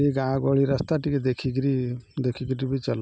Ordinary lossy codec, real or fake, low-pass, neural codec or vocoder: none; real; none; none